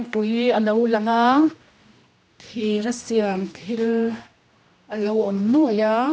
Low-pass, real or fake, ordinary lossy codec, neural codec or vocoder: none; fake; none; codec, 16 kHz, 1 kbps, X-Codec, HuBERT features, trained on general audio